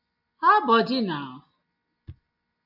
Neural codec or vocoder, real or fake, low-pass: none; real; 5.4 kHz